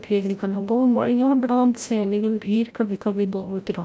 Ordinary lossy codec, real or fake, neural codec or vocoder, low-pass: none; fake; codec, 16 kHz, 0.5 kbps, FreqCodec, larger model; none